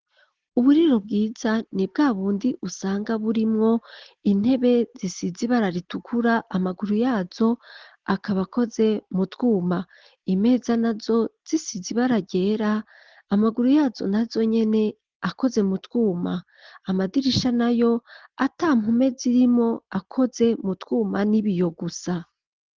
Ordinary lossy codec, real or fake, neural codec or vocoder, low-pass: Opus, 16 kbps; real; none; 7.2 kHz